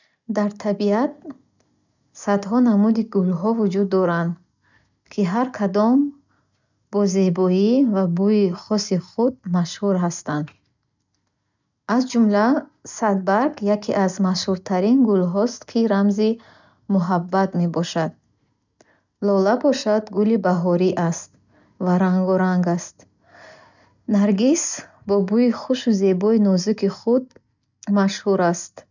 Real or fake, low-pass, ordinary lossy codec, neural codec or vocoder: real; 7.2 kHz; none; none